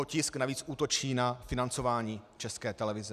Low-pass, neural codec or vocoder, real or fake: 14.4 kHz; none; real